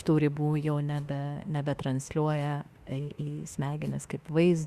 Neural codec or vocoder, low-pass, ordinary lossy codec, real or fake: autoencoder, 48 kHz, 32 numbers a frame, DAC-VAE, trained on Japanese speech; 14.4 kHz; Opus, 64 kbps; fake